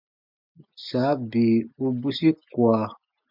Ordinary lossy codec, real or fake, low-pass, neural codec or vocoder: MP3, 48 kbps; real; 5.4 kHz; none